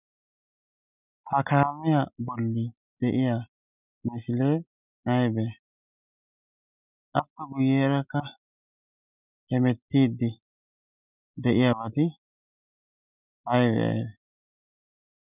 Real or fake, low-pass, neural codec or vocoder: real; 3.6 kHz; none